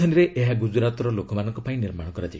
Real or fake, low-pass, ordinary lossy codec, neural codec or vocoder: real; none; none; none